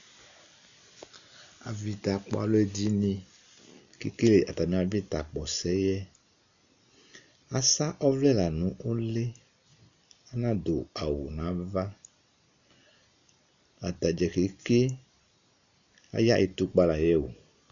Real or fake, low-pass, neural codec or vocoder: real; 7.2 kHz; none